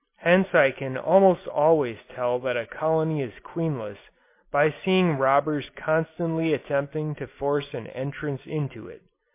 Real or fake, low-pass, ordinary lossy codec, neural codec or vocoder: real; 3.6 kHz; MP3, 24 kbps; none